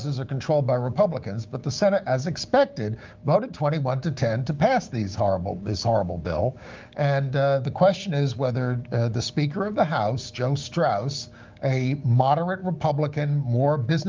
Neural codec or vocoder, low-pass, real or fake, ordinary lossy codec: codec, 16 kHz, 6 kbps, DAC; 7.2 kHz; fake; Opus, 24 kbps